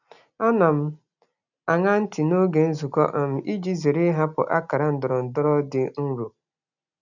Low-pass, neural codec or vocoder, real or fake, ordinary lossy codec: 7.2 kHz; none; real; none